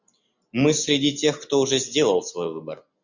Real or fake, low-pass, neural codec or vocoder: real; 7.2 kHz; none